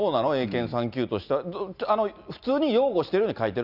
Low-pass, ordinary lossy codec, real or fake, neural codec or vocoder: 5.4 kHz; none; real; none